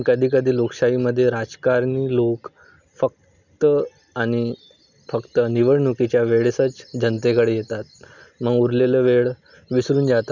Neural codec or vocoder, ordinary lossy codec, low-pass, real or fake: none; none; 7.2 kHz; real